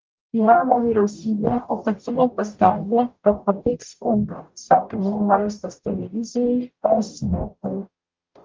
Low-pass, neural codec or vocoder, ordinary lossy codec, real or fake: 7.2 kHz; codec, 44.1 kHz, 0.9 kbps, DAC; Opus, 24 kbps; fake